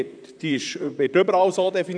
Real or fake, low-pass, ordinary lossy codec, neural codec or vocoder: fake; 9.9 kHz; none; vocoder, 44.1 kHz, 128 mel bands, Pupu-Vocoder